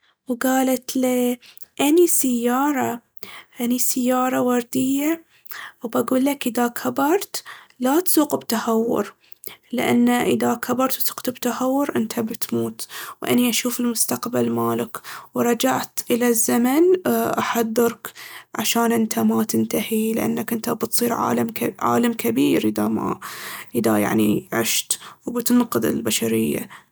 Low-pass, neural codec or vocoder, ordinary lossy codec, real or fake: none; none; none; real